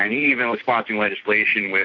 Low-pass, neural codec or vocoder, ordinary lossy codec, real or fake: 7.2 kHz; none; AAC, 48 kbps; real